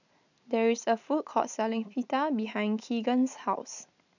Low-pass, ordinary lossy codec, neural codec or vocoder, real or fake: 7.2 kHz; none; none; real